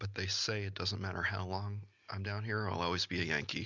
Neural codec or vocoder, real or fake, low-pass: none; real; 7.2 kHz